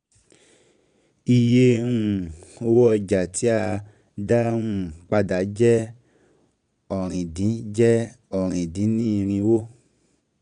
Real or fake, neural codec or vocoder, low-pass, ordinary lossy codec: fake; vocoder, 22.05 kHz, 80 mel bands, Vocos; 9.9 kHz; none